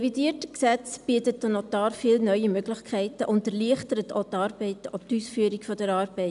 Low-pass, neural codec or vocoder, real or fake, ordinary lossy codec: 10.8 kHz; none; real; none